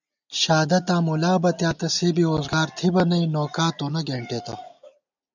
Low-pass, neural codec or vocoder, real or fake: 7.2 kHz; none; real